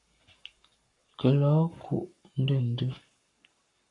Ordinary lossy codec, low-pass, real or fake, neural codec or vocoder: AAC, 48 kbps; 10.8 kHz; fake; codec, 44.1 kHz, 7.8 kbps, Pupu-Codec